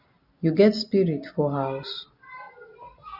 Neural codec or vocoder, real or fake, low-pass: none; real; 5.4 kHz